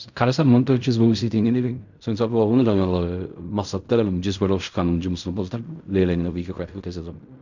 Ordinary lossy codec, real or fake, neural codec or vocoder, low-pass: none; fake; codec, 16 kHz in and 24 kHz out, 0.4 kbps, LongCat-Audio-Codec, fine tuned four codebook decoder; 7.2 kHz